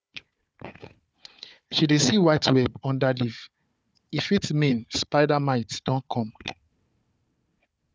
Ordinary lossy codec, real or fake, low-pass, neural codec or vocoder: none; fake; none; codec, 16 kHz, 4 kbps, FunCodec, trained on Chinese and English, 50 frames a second